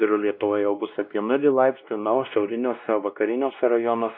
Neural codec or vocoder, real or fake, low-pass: codec, 16 kHz, 1 kbps, X-Codec, WavLM features, trained on Multilingual LibriSpeech; fake; 5.4 kHz